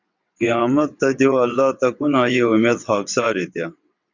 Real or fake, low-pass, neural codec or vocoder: fake; 7.2 kHz; vocoder, 22.05 kHz, 80 mel bands, WaveNeXt